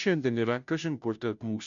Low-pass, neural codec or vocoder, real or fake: 7.2 kHz; codec, 16 kHz, 0.5 kbps, FunCodec, trained on Chinese and English, 25 frames a second; fake